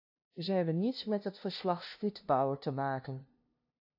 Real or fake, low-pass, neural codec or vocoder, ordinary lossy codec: fake; 5.4 kHz; codec, 16 kHz, 0.5 kbps, FunCodec, trained on LibriTTS, 25 frames a second; AAC, 48 kbps